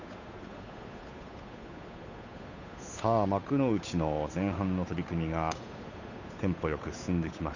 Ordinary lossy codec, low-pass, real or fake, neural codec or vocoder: none; 7.2 kHz; fake; codec, 16 kHz, 8 kbps, FunCodec, trained on Chinese and English, 25 frames a second